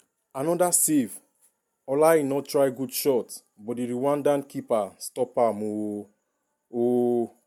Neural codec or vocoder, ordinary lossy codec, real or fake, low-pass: none; MP3, 96 kbps; real; 19.8 kHz